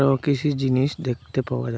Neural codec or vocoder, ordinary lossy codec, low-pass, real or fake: none; none; none; real